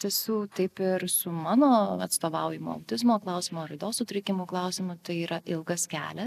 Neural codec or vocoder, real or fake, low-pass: none; real; 14.4 kHz